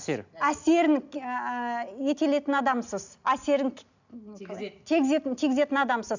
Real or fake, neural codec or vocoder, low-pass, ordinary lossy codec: real; none; 7.2 kHz; none